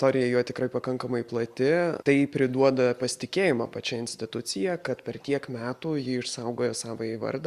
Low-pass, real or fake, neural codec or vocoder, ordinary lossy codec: 14.4 kHz; real; none; Opus, 64 kbps